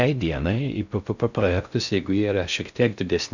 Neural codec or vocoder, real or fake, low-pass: codec, 16 kHz in and 24 kHz out, 0.6 kbps, FocalCodec, streaming, 4096 codes; fake; 7.2 kHz